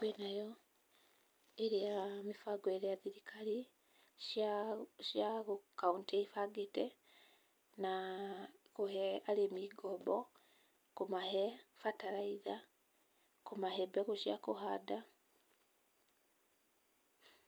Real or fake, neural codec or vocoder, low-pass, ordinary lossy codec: real; none; none; none